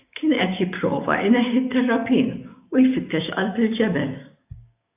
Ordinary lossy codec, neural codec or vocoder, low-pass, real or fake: AAC, 32 kbps; codec, 16 kHz, 6 kbps, DAC; 3.6 kHz; fake